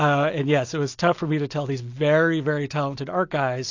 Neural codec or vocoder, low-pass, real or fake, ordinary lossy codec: none; 7.2 kHz; real; AAC, 48 kbps